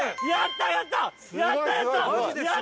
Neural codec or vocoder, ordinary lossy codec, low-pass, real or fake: none; none; none; real